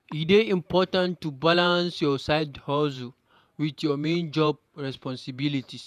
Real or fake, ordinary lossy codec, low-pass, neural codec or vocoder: fake; none; 14.4 kHz; vocoder, 48 kHz, 128 mel bands, Vocos